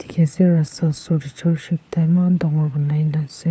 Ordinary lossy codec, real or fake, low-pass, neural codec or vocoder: none; fake; none; codec, 16 kHz, 4 kbps, FunCodec, trained on LibriTTS, 50 frames a second